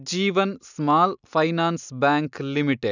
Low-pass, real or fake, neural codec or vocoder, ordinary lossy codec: 7.2 kHz; real; none; none